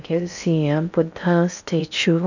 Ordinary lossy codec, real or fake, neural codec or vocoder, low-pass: none; fake; codec, 16 kHz in and 24 kHz out, 0.6 kbps, FocalCodec, streaming, 4096 codes; 7.2 kHz